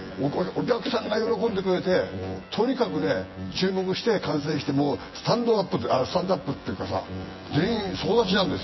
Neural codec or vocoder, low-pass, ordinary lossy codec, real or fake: vocoder, 24 kHz, 100 mel bands, Vocos; 7.2 kHz; MP3, 24 kbps; fake